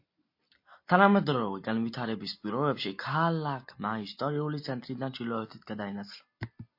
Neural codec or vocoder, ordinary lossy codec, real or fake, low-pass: none; MP3, 32 kbps; real; 5.4 kHz